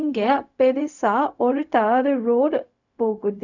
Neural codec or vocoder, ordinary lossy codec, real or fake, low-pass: codec, 16 kHz, 0.4 kbps, LongCat-Audio-Codec; none; fake; 7.2 kHz